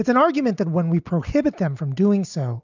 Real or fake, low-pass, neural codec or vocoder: real; 7.2 kHz; none